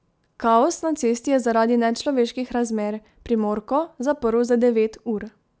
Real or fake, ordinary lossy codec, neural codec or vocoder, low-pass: real; none; none; none